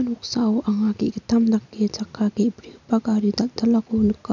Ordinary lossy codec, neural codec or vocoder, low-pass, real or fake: none; none; 7.2 kHz; real